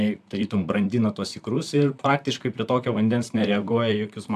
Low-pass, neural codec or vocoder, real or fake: 14.4 kHz; vocoder, 44.1 kHz, 128 mel bands, Pupu-Vocoder; fake